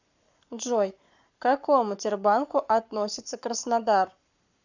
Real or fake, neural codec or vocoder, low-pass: fake; codec, 44.1 kHz, 7.8 kbps, Pupu-Codec; 7.2 kHz